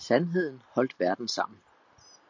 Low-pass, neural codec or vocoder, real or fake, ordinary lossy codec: 7.2 kHz; none; real; MP3, 48 kbps